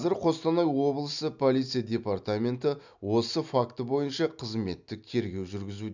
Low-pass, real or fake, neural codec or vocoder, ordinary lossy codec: 7.2 kHz; real; none; none